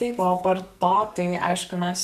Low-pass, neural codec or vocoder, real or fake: 14.4 kHz; codec, 44.1 kHz, 2.6 kbps, SNAC; fake